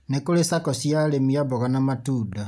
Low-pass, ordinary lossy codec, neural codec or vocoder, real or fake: none; none; none; real